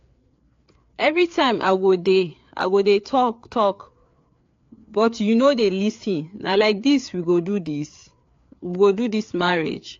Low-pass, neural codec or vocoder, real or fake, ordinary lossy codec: 7.2 kHz; codec, 16 kHz, 4 kbps, FreqCodec, larger model; fake; AAC, 48 kbps